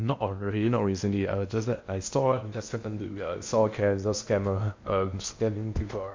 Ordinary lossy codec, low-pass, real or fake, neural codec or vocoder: MP3, 48 kbps; 7.2 kHz; fake; codec, 16 kHz in and 24 kHz out, 0.8 kbps, FocalCodec, streaming, 65536 codes